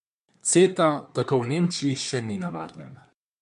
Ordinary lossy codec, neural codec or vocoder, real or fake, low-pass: MP3, 64 kbps; codec, 24 kHz, 1 kbps, SNAC; fake; 10.8 kHz